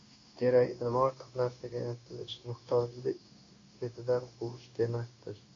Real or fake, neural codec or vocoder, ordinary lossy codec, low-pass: fake; codec, 16 kHz, 0.9 kbps, LongCat-Audio-Codec; AAC, 32 kbps; 7.2 kHz